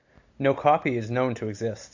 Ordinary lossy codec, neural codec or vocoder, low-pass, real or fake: AAC, 48 kbps; none; 7.2 kHz; real